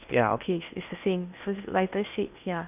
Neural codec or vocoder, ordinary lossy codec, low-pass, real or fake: codec, 16 kHz in and 24 kHz out, 0.8 kbps, FocalCodec, streaming, 65536 codes; none; 3.6 kHz; fake